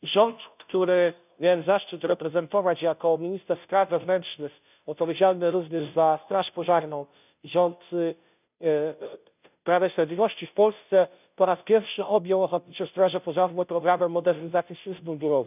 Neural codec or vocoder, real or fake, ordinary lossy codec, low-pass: codec, 16 kHz, 0.5 kbps, FunCodec, trained on Chinese and English, 25 frames a second; fake; none; 3.6 kHz